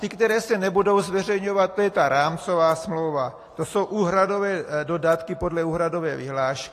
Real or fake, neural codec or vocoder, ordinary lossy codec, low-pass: real; none; AAC, 48 kbps; 14.4 kHz